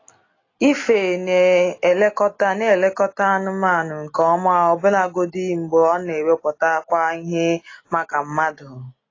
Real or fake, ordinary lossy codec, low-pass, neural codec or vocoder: real; AAC, 32 kbps; 7.2 kHz; none